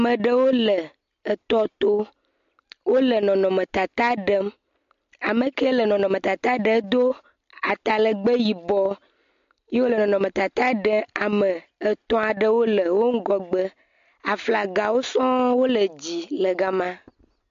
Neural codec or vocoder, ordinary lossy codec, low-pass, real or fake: none; MP3, 48 kbps; 7.2 kHz; real